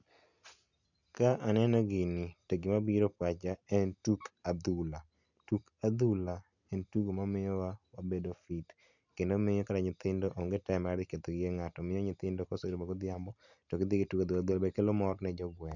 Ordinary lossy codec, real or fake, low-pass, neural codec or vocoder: none; real; 7.2 kHz; none